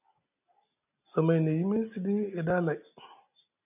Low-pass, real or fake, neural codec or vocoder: 3.6 kHz; real; none